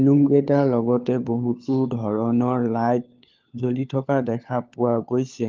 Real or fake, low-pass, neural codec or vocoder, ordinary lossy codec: fake; 7.2 kHz; codec, 16 kHz, 4 kbps, FunCodec, trained on LibriTTS, 50 frames a second; Opus, 32 kbps